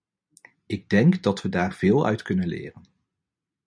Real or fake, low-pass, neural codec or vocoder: real; 9.9 kHz; none